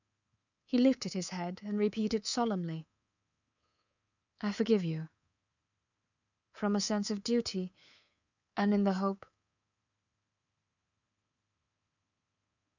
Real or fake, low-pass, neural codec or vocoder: fake; 7.2 kHz; codec, 24 kHz, 3.1 kbps, DualCodec